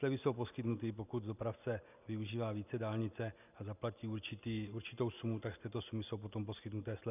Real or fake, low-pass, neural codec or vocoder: real; 3.6 kHz; none